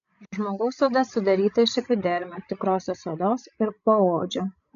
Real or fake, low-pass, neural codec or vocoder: fake; 7.2 kHz; codec, 16 kHz, 16 kbps, FreqCodec, larger model